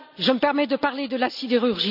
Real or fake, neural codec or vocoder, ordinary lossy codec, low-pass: real; none; none; 5.4 kHz